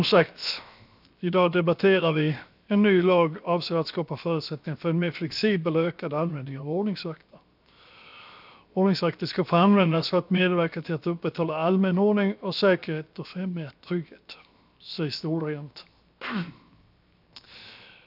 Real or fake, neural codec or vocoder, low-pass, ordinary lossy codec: fake; codec, 16 kHz, 0.7 kbps, FocalCodec; 5.4 kHz; none